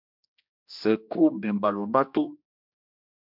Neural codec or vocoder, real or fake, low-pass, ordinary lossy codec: codec, 16 kHz, 1 kbps, X-Codec, HuBERT features, trained on general audio; fake; 5.4 kHz; MP3, 48 kbps